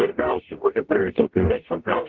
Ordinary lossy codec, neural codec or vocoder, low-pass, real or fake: Opus, 16 kbps; codec, 44.1 kHz, 0.9 kbps, DAC; 7.2 kHz; fake